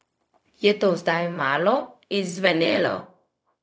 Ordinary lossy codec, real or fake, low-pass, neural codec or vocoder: none; fake; none; codec, 16 kHz, 0.4 kbps, LongCat-Audio-Codec